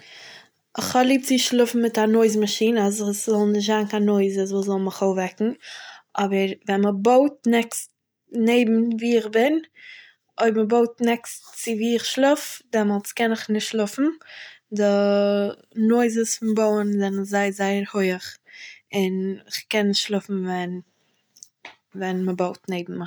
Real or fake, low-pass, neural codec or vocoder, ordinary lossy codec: real; none; none; none